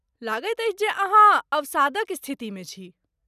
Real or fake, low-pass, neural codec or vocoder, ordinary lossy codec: real; 14.4 kHz; none; none